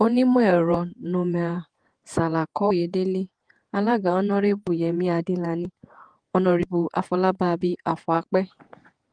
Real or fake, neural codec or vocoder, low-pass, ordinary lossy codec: fake; vocoder, 48 kHz, 128 mel bands, Vocos; 9.9 kHz; Opus, 24 kbps